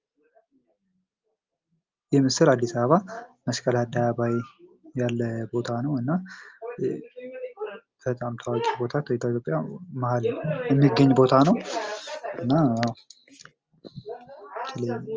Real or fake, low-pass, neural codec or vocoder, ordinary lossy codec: real; 7.2 kHz; none; Opus, 24 kbps